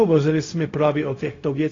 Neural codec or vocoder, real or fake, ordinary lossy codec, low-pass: codec, 16 kHz, 0.4 kbps, LongCat-Audio-Codec; fake; AAC, 32 kbps; 7.2 kHz